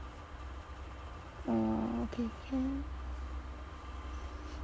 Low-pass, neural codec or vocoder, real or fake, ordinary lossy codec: none; none; real; none